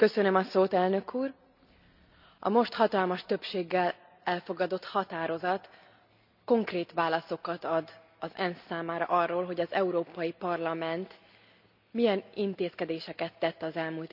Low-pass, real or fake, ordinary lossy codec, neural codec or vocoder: 5.4 kHz; real; none; none